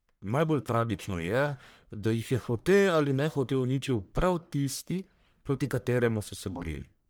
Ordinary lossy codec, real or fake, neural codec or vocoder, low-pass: none; fake; codec, 44.1 kHz, 1.7 kbps, Pupu-Codec; none